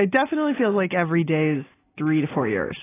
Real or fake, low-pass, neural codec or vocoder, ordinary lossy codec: real; 3.6 kHz; none; AAC, 16 kbps